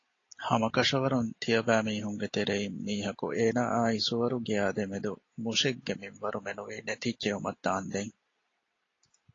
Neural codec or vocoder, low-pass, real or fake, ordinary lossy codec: none; 7.2 kHz; real; AAC, 32 kbps